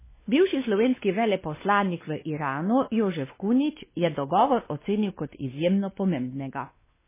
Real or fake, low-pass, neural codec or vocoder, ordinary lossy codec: fake; 3.6 kHz; codec, 16 kHz, 2 kbps, X-Codec, WavLM features, trained on Multilingual LibriSpeech; MP3, 16 kbps